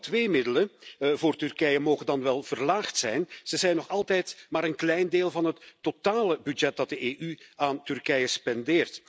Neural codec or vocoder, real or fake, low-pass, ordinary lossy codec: none; real; none; none